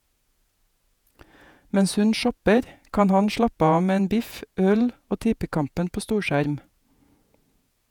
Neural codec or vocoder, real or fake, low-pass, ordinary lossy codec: vocoder, 48 kHz, 128 mel bands, Vocos; fake; 19.8 kHz; none